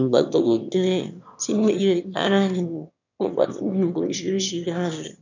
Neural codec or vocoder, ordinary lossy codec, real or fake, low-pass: autoencoder, 22.05 kHz, a latent of 192 numbers a frame, VITS, trained on one speaker; none; fake; 7.2 kHz